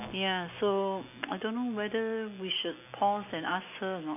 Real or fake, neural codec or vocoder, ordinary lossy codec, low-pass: real; none; none; 3.6 kHz